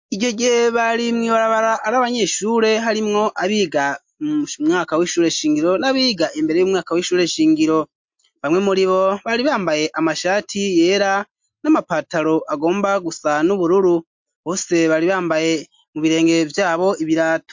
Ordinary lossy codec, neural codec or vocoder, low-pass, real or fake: MP3, 48 kbps; none; 7.2 kHz; real